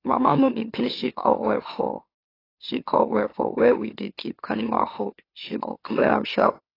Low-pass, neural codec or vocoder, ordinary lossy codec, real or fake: 5.4 kHz; autoencoder, 44.1 kHz, a latent of 192 numbers a frame, MeloTTS; AAC, 24 kbps; fake